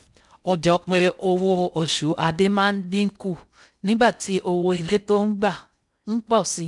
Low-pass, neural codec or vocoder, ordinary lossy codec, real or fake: 10.8 kHz; codec, 16 kHz in and 24 kHz out, 0.6 kbps, FocalCodec, streaming, 4096 codes; none; fake